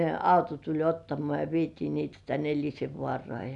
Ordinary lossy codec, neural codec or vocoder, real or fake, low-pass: none; none; real; 10.8 kHz